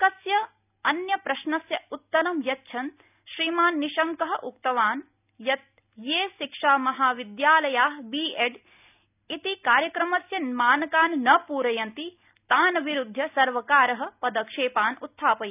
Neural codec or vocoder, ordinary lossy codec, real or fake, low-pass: none; none; real; 3.6 kHz